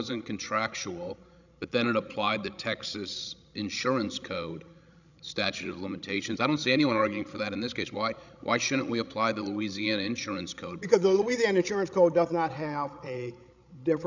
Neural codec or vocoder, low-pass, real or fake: codec, 16 kHz, 16 kbps, FreqCodec, larger model; 7.2 kHz; fake